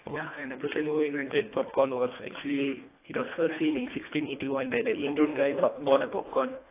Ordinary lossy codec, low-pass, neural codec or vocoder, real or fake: AAC, 24 kbps; 3.6 kHz; codec, 24 kHz, 1.5 kbps, HILCodec; fake